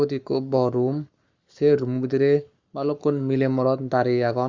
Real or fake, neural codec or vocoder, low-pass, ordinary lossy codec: fake; codec, 44.1 kHz, 7.8 kbps, Pupu-Codec; 7.2 kHz; Opus, 64 kbps